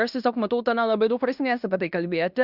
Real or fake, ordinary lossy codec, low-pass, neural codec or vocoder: fake; Opus, 64 kbps; 5.4 kHz; codec, 16 kHz in and 24 kHz out, 0.9 kbps, LongCat-Audio-Codec, fine tuned four codebook decoder